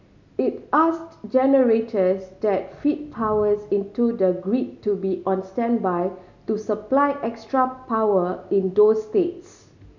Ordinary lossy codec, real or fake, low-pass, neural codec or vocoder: none; real; 7.2 kHz; none